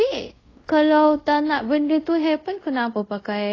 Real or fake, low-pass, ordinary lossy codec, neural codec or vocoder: fake; 7.2 kHz; AAC, 32 kbps; codec, 24 kHz, 0.5 kbps, DualCodec